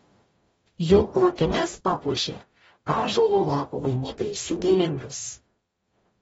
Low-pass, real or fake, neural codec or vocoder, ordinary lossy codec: 19.8 kHz; fake; codec, 44.1 kHz, 0.9 kbps, DAC; AAC, 24 kbps